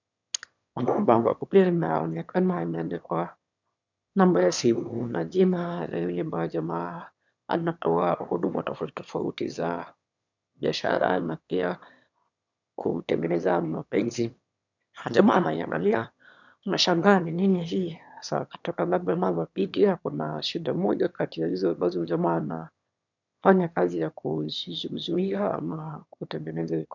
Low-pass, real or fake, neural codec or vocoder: 7.2 kHz; fake; autoencoder, 22.05 kHz, a latent of 192 numbers a frame, VITS, trained on one speaker